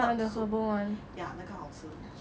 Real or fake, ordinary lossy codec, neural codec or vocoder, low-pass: real; none; none; none